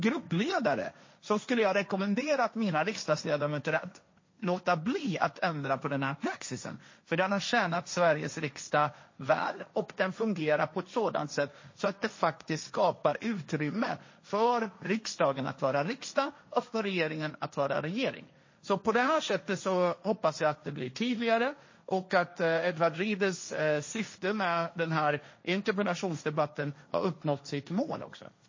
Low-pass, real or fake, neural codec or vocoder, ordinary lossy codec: 7.2 kHz; fake; codec, 16 kHz, 1.1 kbps, Voila-Tokenizer; MP3, 32 kbps